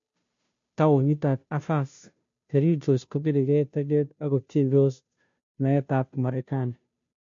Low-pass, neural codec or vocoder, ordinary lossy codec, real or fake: 7.2 kHz; codec, 16 kHz, 0.5 kbps, FunCodec, trained on Chinese and English, 25 frames a second; MP3, 48 kbps; fake